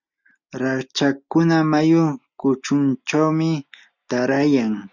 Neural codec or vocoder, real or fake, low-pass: none; real; 7.2 kHz